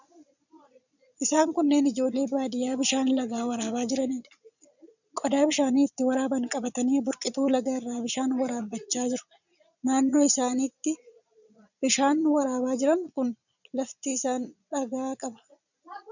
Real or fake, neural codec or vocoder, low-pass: fake; vocoder, 22.05 kHz, 80 mel bands, Vocos; 7.2 kHz